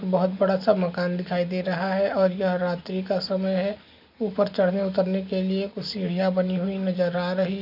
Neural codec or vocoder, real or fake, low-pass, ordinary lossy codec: none; real; 5.4 kHz; none